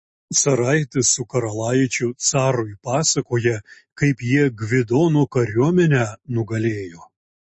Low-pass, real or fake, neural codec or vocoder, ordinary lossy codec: 10.8 kHz; real; none; MP3, 32 kbps